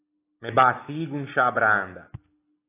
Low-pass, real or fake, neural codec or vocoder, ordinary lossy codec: 3.6 kHz; real; none; AAC, 16 kbps